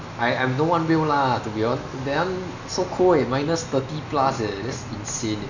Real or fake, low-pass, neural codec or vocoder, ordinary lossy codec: real; 7.2 kHz; none; none